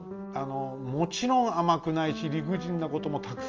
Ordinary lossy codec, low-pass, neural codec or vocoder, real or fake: Opus, 24 kbps; 7.2 kHz; none; real